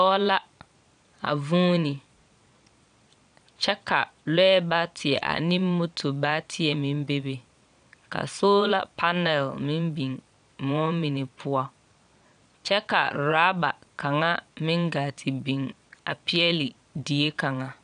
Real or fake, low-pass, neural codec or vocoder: fake; 9.9 kHz; vocoder, 22.05 kHz, 80 mel bands, Vocos